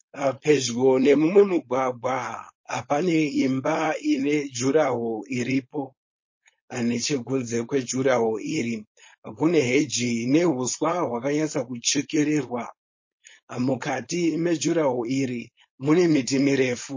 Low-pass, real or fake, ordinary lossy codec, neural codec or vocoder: 7.2 kHz; fake; MP3, 32 kbps; codec, 16 kHz, 4.8 kbps, FACodec